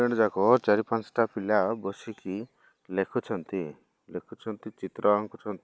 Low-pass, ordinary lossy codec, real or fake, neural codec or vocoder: none; none; real; none